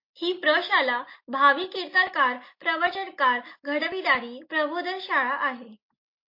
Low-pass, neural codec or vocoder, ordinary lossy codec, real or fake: 5.4 kHz; none; MP3, 32 kbps; real